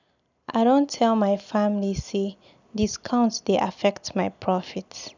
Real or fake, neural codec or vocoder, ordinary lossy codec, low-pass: real; none; none; 7.2 kHz